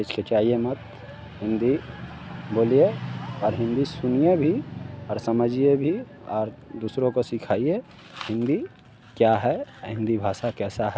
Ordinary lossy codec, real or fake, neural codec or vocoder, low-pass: none; real; none; none